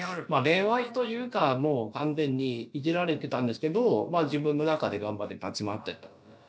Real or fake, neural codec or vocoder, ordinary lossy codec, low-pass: fake; codec, 16 kHz, about 1 kbps, DyCAST, with the encoder's durations; none; none